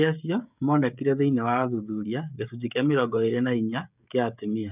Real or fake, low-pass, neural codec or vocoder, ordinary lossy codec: fake; 3.6 kHz; codec, 16 kHz, 16 kbps, FreqCodec, smaller model; none